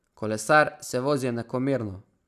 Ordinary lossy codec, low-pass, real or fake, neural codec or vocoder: none; 14.4 kHz; real; none